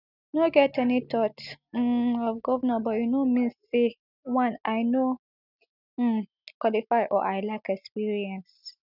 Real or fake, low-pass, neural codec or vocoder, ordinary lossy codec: real; 5.4 kHz; none; none